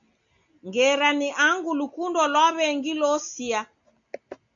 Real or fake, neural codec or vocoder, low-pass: real; none; 7.2 kHz